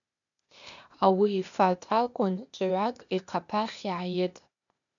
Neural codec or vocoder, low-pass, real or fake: codec, 16 kHz, 0.8 kbps, ZipCodec; 7.2 kHz; fake